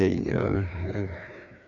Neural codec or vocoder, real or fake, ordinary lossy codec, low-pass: codec, 16 kHz, 2 kbps, FreqCodec, larger model; fake; MP3, 64 kbps; 7.2 kHz